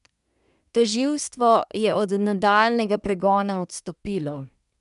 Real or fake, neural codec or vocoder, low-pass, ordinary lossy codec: fake; codec, 24 kHz, 1 kbps, SNAC; 10.8 kHz; none